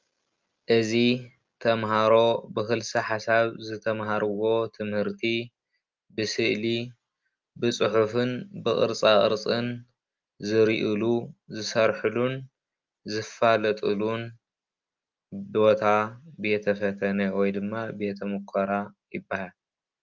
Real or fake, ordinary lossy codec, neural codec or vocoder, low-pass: real; Opus, 24 kbps; none; 7.2 kHz